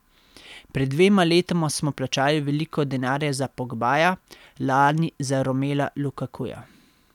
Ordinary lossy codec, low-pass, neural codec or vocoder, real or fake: none; 19.8 kHz; none; real